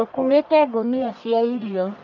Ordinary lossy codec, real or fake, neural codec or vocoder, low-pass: none; fake; codec, 44.1 kHz, 1.7 kbps, Pupu-Codec; 7.2 kHz